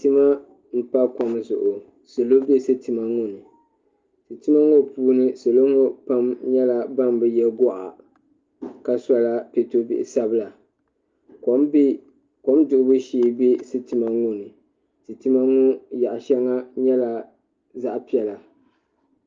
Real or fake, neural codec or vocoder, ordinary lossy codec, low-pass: real; none; Opus, 24 kbps; 7.2 kHz